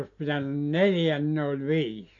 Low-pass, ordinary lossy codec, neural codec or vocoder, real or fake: 7.2 kHz; AAC, 48 kbps; none; real